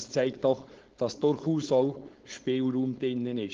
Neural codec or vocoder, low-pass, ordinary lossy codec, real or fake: codec, 16 kHz, 4.8 kbps, FACodec; 7.2 kHz; Opus, 16 kbps; fake